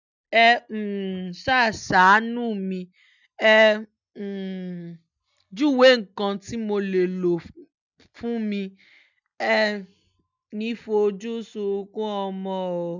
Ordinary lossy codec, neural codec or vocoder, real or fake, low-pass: none; none; real; 7.2 kHz